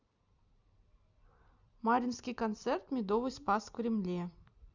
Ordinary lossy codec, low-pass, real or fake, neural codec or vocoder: Opus, 64 kbps; 7.2 kHz; fake; vocoder, 44.1 kHz, 128 mel bands every 256 samples, BigVGAN v2